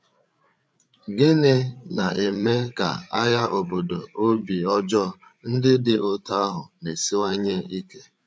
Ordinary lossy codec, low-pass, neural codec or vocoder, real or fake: none; none; codec, 16 kHz, 8 kbps, FreqCodec, larger model; fake